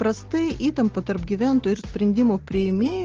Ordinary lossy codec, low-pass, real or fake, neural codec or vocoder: Opus, 16 kbps; 7.2 kHz; real; none